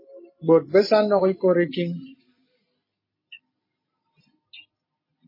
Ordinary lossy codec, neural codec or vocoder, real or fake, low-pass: MP3, 24 kbps; none; real; 5.4 kHz